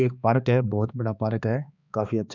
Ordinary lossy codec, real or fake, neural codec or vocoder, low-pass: none; fake; codec, 16 kHz, 2 kbps, X-Codec, HuBERT features, trained on balanced general audio; 7.2 kHz